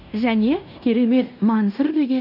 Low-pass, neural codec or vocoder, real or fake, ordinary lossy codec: 5.4 kHz; codec, 16 kHz in and 24 kHz out, 0.9 kbps, LongCat-Audio-Codec, fine tuned four codebook decoder; fake; none